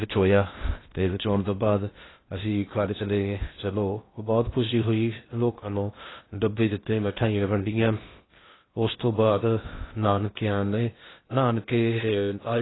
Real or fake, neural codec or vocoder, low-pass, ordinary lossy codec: fake; codec, 16 kHz in and 24 kHz out, 0.6 kbps, FocalCodec, streaming, 2048 codes; 7.2 kHz; AAC, 16 kbps